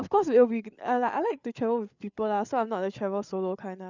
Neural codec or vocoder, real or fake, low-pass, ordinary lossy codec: codec, 16 kHz, 8 kbps, FreqCodec, larger model; fake; 7.2 kHz; none